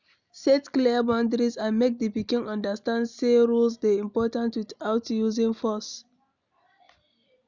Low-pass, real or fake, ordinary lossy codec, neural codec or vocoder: 7.2 kHz; real; none; none